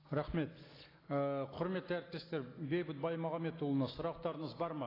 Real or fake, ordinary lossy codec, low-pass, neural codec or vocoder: real; AAC, 24 kbps; 5.4 kHz; none